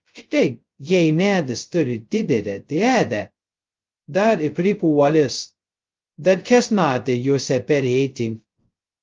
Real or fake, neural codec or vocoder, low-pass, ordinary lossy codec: fake; codec, 16 kHz, 0.2 kbps, FocalCodec; 7.2 kHz; Opus, 32 kbps